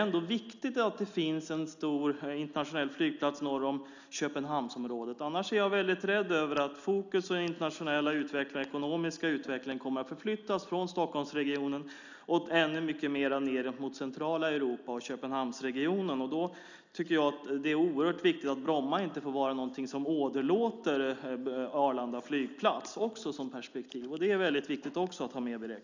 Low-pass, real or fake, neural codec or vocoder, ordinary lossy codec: 7.2 kHz; real; none; none